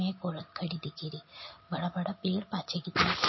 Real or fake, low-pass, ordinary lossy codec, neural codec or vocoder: real; 7.2 kHz; MP3, 24 kbps; none